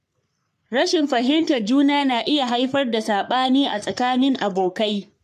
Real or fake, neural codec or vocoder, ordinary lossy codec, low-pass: fake; codec, 44.1 kHz, 3.4 kbps, Pupu-Codec; MP3, 96 kbps; 14.4 kHz